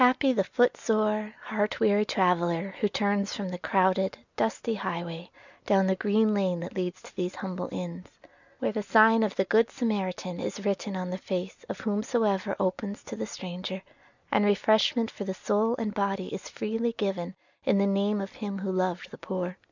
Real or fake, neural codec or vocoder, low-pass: real; none; 7.2 kHz